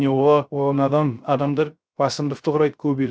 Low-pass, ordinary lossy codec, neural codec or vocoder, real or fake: none; none; codec, 16 kHz, 0.3 kbps, FocalCodec; fake